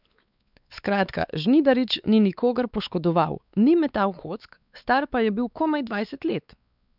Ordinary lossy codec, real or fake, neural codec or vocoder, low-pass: none; fake; codec, 16 kHz, 4 kbps, X-Codec, WavLM features, trained on Multilingual LibriSpeech; 5.4 kHz